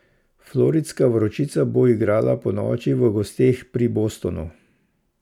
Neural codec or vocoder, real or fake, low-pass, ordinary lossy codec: none; real; 19.8 kHz; none